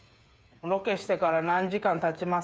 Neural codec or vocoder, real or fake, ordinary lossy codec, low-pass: codec, 16 kHz, 8 kbps, FreqCodec, smaller model; fake; none; none